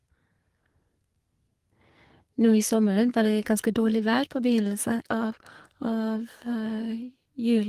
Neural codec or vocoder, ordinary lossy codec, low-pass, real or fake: codec, 44.1 kHz, 2.6 kbps, SNAC; Opus, 24 kbps; 14.4 kHz; fake